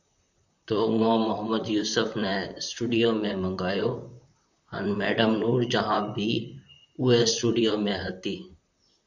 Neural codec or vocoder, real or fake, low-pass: vocoder, 44.1 kHz, 128 mel bands, Pupu-Vocoder; fake; 7.2 kHz